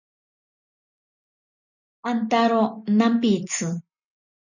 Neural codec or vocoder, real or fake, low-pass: none; real; 7.2 kHz